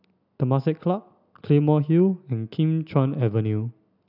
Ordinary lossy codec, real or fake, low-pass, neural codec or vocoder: none; real; 5.4 kHz; none